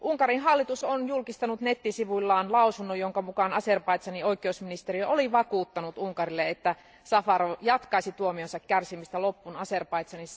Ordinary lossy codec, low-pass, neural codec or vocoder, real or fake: none; none; none; real